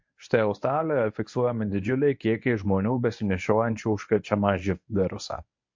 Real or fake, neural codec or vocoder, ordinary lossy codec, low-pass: fake; codec, 24 kHz, 0.9 kbps, WavTokenizer, medium speech release version 1; MP3, 48 kbps; 7.2 kHz